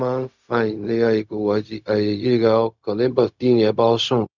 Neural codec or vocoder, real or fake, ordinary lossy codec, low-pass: codec, 16 kHz, 0.4 kbps, LongCat-Audio-Codec; fake; none; 7.2 kHz